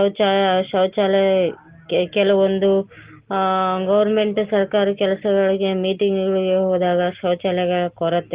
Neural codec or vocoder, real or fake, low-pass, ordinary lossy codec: none; real; 3.6 kHz; Opus, 16 kbps